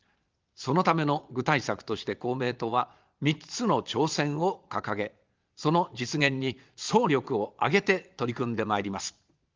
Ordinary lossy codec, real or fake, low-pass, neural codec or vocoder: Opus, 32 kbps; fake; 7.2 kHz; vocoder, 22.05 kHz, 80 mel bands, Vocos